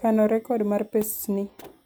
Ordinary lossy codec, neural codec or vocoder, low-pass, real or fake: none; none; none; real